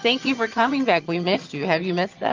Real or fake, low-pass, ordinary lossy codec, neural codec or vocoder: fake; 7.2 kHz; Opus, 32 kbps; vocoder, 22.05 kHz, 80 mel bands, HiFi-GAN